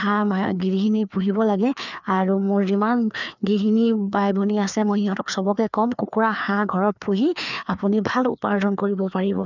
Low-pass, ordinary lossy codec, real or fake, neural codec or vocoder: 7.2 kHz; none; fake; codec, 16 kHz, 2 kbps, FreqCodec, larger model